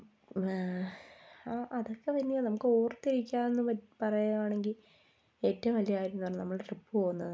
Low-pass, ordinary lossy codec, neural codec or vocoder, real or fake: none; none; none; real